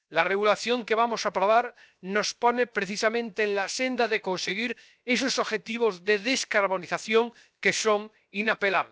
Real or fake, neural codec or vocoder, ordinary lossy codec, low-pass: fake; codec, 16 kHz, 0.7 kbps, FocalCodec; none; none